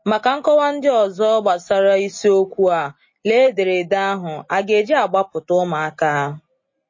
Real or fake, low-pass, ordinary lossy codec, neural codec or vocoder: real; 7.2 kHz; MP3, 32 kbps; none